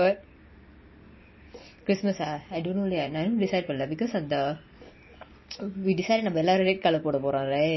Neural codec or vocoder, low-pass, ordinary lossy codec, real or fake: none; 7.2 kHz; MP3, 24 kbps; real